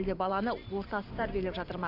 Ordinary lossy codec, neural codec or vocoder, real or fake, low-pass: none; vocoder, 44.1 kHz, 128 mel bands every 512 samples, BigVGAN v2; fake; 5.4 kHz